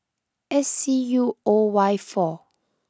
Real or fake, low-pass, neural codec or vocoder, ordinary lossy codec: real; none; none; none